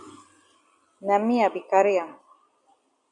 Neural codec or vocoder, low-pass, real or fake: vocoder, 44.1 kHz, 128 mel bands every 256 samples, BigVGAN v2; 10.8 kHz; fake